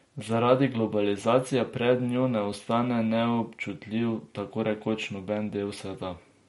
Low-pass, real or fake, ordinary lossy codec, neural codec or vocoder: 19.8 kHz; real; MP3, 48 kbps; none